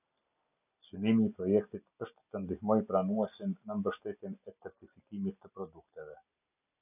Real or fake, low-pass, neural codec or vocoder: real; 3.6 kHz; none